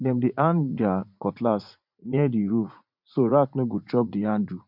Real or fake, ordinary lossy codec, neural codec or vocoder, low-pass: fake; MP3, 48 kbps; vocoder, 44.1 kHz, 80 mel bands, Vocos; 5.4 kHz